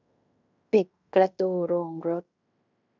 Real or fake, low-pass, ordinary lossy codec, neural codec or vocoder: fake; 7.2 kHz; none; codec, 16 kHz in and 24 kHz out, 0.9 kbps, LongCat-Audio-Codec, fine tuned four codebook decoder